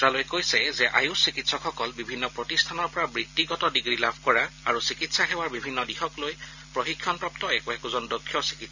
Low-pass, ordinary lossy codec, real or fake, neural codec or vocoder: 7.2 kHz; none; real; none